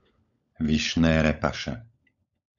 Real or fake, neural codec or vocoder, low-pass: fake; codec, 16 kHz, 16 kbps, FunCodec, trained on LibriTTS, 50 frames a second; 7.2 kHz